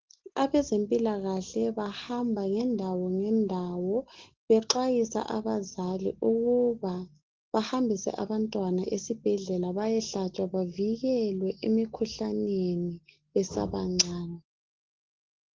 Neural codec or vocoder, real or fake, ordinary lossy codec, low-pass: none; real; Opus, 16 kbps; 7.2 kHz